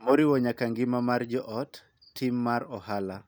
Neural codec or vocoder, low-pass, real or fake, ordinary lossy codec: none; none; real; none